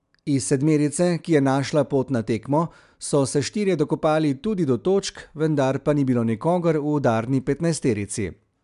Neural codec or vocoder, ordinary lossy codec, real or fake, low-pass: none; MP3, 96 kbps; real; 10.8 kHz